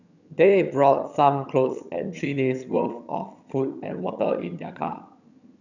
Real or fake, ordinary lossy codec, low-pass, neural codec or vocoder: fake; none; 7.2 kHz; vocoder, 22.05 kHz, 80 mel bands, HiFi-GAN